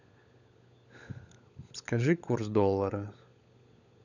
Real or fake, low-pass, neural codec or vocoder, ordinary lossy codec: fake; 7.2 kHz; codec, 16 kHz, 16 kbps, FunCodec, trained on LibriTTS, 50 frames a second; none